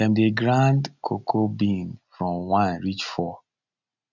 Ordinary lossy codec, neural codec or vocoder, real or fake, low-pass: none; none; real; 7.2 kHz